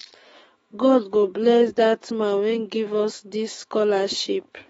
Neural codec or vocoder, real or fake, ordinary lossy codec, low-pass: autoencoder, 48 kHz, 128 numbers a frame, DAC-VAE, trained on Japanese speech; fake; AAC, 24 kbps; 19.8 kHz